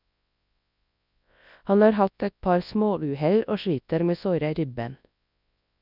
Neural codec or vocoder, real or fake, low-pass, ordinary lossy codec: codec, 24 kHz, 0.9 kbps, WavTokenizer, large speech release; fake; 5.4 kHz; none